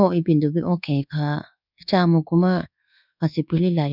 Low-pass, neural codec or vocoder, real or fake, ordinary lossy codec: 5.4 kHz; codec, 24 kHz, 1.2 kbps, DualCodec; fake; none